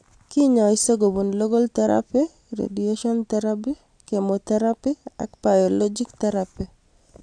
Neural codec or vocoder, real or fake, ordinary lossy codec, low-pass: none; real; AAC, 64 kbps; 9.9 kHz